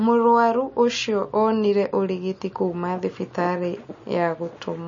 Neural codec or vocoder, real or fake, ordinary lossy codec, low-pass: none; real; MP3, 32 kbps; 7.2 kHz